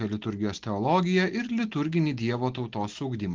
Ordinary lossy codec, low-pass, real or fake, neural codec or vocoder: Opus, 16 kbps; 7.2 kHz; real; none